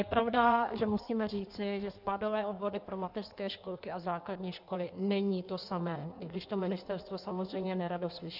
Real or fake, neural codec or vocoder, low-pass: fake; codec, 16 kHz in and 24 kHz out, 1.1 kbps, FireRedTTS-2 codec; 5.4 kHz